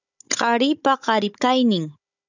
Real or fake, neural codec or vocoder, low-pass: fake; codec, 16 kHz, 16 kbps, FunCodec, trained on Chinese and English, 50 frames a second; 7.2 kHz